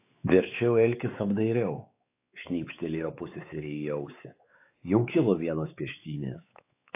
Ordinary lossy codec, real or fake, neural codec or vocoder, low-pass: AAC, 24 kbps; fake; codec, 16 kHz, 4 kbps, X-Codec, WavLM features, trained on Multilingual LibriSpeech; 3.6 kHz